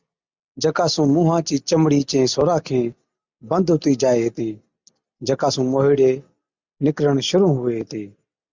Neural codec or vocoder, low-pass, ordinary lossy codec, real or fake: none; 7.2 kHz; Opus, 64 kbps; real